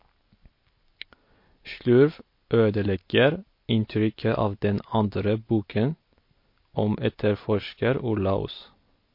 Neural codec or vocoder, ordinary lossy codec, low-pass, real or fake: none; MP3, 32 kbps; 5.4 kHz; real